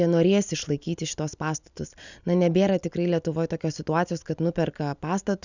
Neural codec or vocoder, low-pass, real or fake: none; 7.2 kHz; real